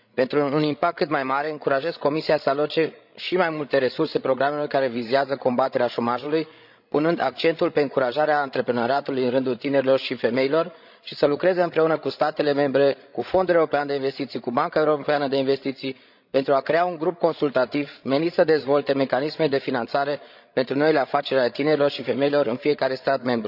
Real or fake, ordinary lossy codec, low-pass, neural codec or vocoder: fake; none; 5.4 kHz; codec, 16 kHz, 16 kbps, FreqCodec, larger model